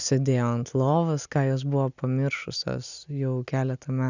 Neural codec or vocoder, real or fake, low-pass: none; real; 7.2 kHz